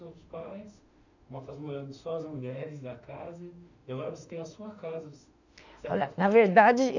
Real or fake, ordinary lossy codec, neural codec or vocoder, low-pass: fake; none; autoencoder, 48 kHz, 32 numbers a frame, DAC-VAE, trained on Japanese speech; 7.2 kHz